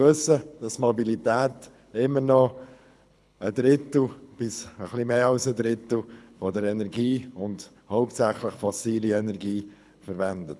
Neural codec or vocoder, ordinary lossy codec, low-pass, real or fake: codec, 24 kHz, 6 kbps, HILCodec; none; none; fake